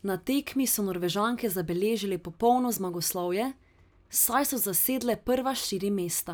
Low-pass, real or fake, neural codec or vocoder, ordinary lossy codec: none; real; none; none